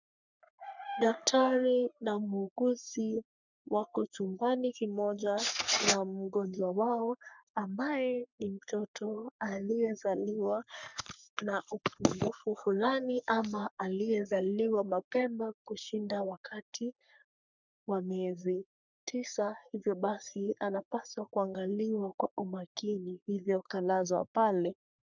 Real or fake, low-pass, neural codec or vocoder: fake; 7.2 kHz; codec, 44.1 kHz, 3.4 kbps, Pupu-Codec